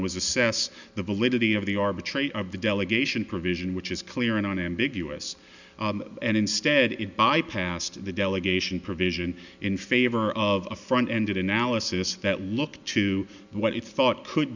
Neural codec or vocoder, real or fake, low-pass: none; real; 7.2 kHz